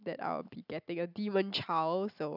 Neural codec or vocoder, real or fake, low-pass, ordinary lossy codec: none; real; 5.4 kHz; none